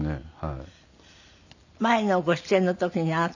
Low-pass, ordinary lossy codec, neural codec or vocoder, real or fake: 7.2 kHz; none; none; real